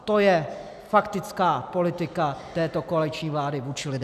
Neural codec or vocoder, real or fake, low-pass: none; real; 14.4 kHz